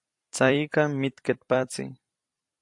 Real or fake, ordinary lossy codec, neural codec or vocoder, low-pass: fake; AAC, 64 kbps; vocoder, 44.1 kHz, 128 mel bands every 256 samples, BigVGAN v2; 10.8 kHz